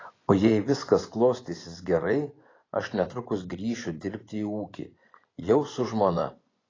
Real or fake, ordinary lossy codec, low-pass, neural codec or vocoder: fake; AAC, 32 kbps; 7.2 kHz; vocoder, 24 kHz, 100 mel bands, Vocos